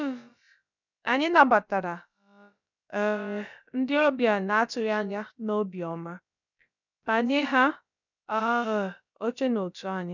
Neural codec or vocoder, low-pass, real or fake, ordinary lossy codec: codec, 16 kHz, about 1 kbps, DyCAST, with the encoder's durations; 7.2 kHz; fake; none